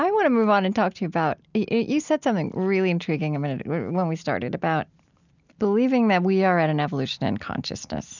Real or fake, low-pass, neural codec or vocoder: real; 7.2 kHz; none